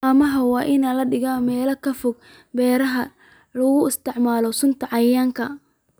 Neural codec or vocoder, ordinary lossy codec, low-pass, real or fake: none; none; none; real